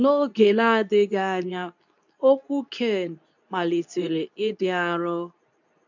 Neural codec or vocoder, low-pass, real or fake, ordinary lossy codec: codec, 24 kHz, 0.9 kbps, WavTokenizer, medium speech release version 2; 7.2 kHz; fake; none